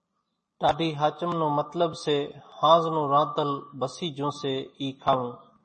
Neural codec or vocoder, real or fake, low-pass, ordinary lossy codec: vocoder, 44.1 kHz, 128 mel bands every 256 samples, BigVGAN v2; fake; 10.8 kHz; MP3, 32 kbps